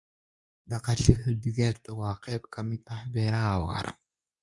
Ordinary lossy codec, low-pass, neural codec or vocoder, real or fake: MP3, 96 kbps; 10.8 kHz; codec, 24 kHz, 0.9 kbps, WavTokenizer, medium speech release version 2; fake